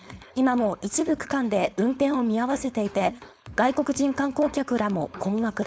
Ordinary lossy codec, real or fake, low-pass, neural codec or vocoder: none; fake; none; codec, 16 kHz, 4.8 kbps, FACodec